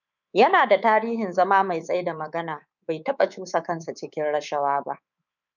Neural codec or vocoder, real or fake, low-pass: codec, 24 kHz, 3.1 kbps, DualCodec; fake; 7.2 kHz